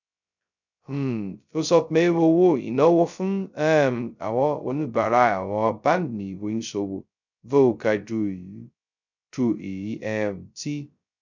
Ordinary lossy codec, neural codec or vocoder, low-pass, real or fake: none; codec, 16 kHz, 0.2 kbps, FocalCodec; 7.2 kHz; fake